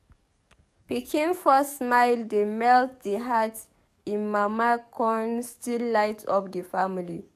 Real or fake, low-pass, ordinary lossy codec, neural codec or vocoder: fake; 14.4 kHz; none; codec, 44.1 kHz, 7.8 kbps, DAC